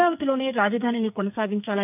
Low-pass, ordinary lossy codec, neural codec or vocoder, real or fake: 3.6 kHz; none; codec, 44.1 kHz, 2.6 kbps, SNAC; fake